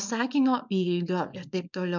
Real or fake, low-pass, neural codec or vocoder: fake; 7.2 kHz; codec, 24 kHz, 0.9 kbps, WavTokenizer, small release